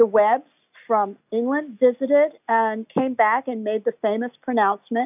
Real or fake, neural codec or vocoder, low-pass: real; none; 3.6 kHz